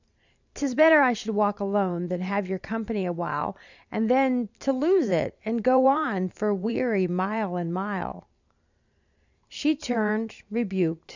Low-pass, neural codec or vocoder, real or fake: 7.2 kHz; vocoder, 44.1 kHz, 128 mel bands every 512 samples, BigVGAN v2; fake